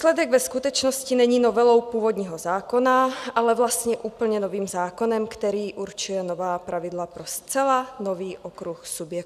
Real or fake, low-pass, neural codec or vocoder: real; 14.4 kHz; none